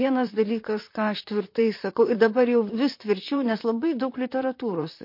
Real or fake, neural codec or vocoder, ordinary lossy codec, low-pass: fake; vocoder, 44.1 kHz, 128 mel bands, Pupu-Vocoder; MP3, 32 kbps; 5.4 kHz